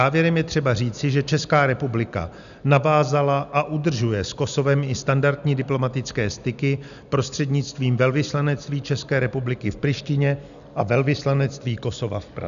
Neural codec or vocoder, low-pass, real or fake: none; 7.2 kHz; real